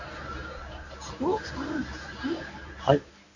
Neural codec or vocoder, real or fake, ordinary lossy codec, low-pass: codec, 24 kHz, 0.9 kbps, WavTokenizer, medium speech release version 1; fake; none; 7.2 kHz